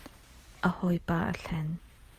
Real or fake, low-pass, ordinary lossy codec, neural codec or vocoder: fake; 14.4 kHz; Opus, 32 kbps; vocoder, 48 kHz, 128 mel bands, Vocos